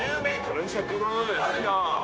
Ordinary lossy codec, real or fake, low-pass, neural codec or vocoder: none; fake; none; codec, 16 kHz, 0.9 kbps, LongCat-Audio-Codec